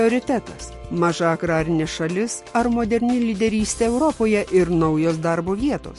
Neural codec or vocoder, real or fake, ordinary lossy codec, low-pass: none; real; MP3, 48 kbps; 14.4 kHz